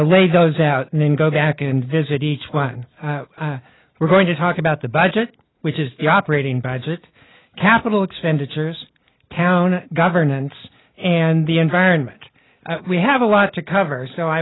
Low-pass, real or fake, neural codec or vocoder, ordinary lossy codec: 7.2 kHz; real; none; AAC, 16 kbps